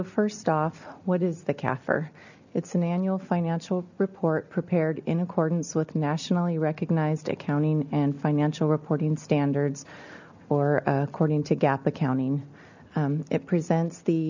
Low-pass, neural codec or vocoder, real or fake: 7.2 kHz; none; real